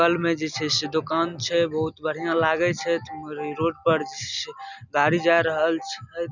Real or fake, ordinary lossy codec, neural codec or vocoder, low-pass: real; none; none; 7.2 kHz